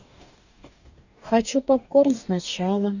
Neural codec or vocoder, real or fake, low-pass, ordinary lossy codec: codec, 44.1 kHz, 2.6 kbps, DAC; fake; 7.2 kHz; none